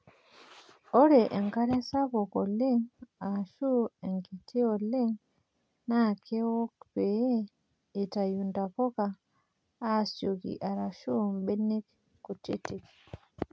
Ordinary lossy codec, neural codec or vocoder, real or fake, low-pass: none; none; real; none